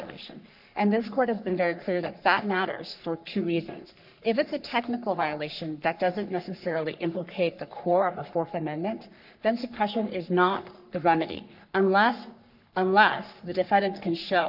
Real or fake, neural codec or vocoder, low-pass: fake; codec, 44.1 kHz, 3.4 kbps, Pupu-Codec; 5.4 kHz